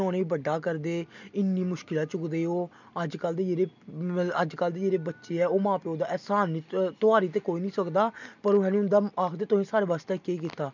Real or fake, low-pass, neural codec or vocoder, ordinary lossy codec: real; 7.2 kHz; none; none